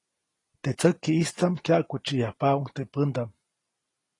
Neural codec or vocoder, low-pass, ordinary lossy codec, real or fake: none; 10.8 kHz; AAC, 32 kbps; real